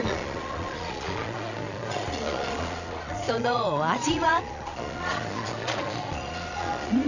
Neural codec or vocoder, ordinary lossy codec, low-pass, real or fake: vocoder, 22.05 kHz, 80 mel bands, Vocos; none; 7.2 kHz; fake